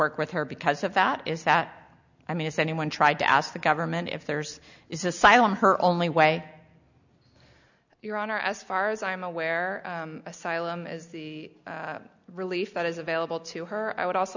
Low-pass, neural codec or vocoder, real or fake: 7.2 kHz; none; real